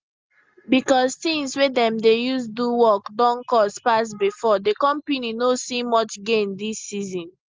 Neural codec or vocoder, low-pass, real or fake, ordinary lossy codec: none; 7.2 kHz; real; Opus, 32 kbps